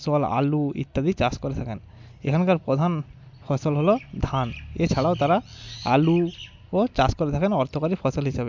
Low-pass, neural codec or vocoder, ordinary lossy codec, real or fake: 7.2 kHz; none; MP3, 64 kbps; real